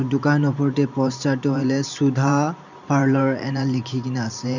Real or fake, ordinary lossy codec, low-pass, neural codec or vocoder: fake; none; 7.2 kHz; vocoder, 44.1 kHz, 128 mel bands every 512 samples, BigVGAN v2